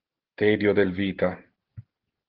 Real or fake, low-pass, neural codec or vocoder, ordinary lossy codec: real; 5.4 kHz; none; Opus, 16 kbps